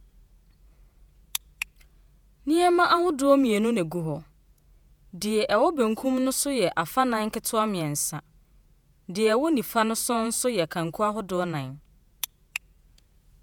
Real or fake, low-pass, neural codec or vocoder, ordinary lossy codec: fake; none; vocoder, 48 kHz, 128 mel bands, Vocos; none